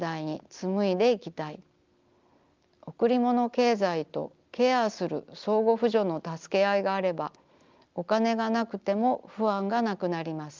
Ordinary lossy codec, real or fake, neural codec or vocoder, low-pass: Opus, 24 kbps; real; none; 7.2 kHz